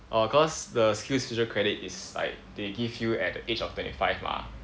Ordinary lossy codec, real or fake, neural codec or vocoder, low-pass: none; real; none; none